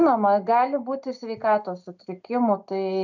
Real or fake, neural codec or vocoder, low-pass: real; none; 7.2 kHz